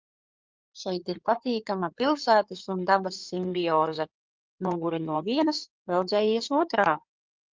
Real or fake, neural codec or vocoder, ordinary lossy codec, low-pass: fake; codec, 16 kHz in and 24 kHz out, 2.2 kbps, FireRedTTS-2 codec; Opus, 32 kbps; 7.2 kHz